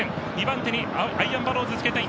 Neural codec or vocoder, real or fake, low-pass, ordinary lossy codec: none; real; none; none